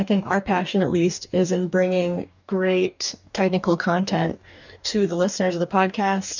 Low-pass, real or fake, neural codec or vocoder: 7.2 kHz; fake; codec, 44.1 kHz, 2.6 kbps, DAC